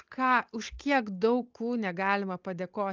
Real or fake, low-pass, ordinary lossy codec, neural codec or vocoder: real; 7.2 kHz; Opus, 24 kbps; none